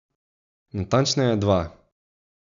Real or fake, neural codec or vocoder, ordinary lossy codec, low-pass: real; none; none; 7.2 kHz